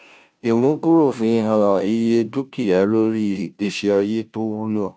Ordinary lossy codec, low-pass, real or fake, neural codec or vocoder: none; none; fake; codec, 16 kHz, 0.5 kbps, FunCodec, trained on Chinese and English, 25 frames a second